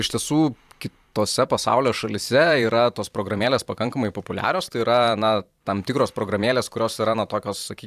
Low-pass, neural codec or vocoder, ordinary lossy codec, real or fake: 14.4 kHz; vocoder, 44.1 kHz, 128 mel bands every 512 samples, BigVGAN v2; AAC, 96 kbps; fake